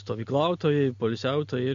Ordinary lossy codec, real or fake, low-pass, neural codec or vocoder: MP3, 48 kbps; real; 7.2 kHz; none